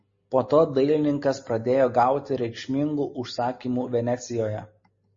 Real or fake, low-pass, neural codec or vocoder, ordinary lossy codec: real; 7.2 kHz; none; MP3, 32 kbps